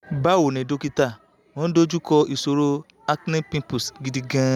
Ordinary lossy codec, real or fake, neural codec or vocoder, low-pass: none; real; none; 19.8 kHz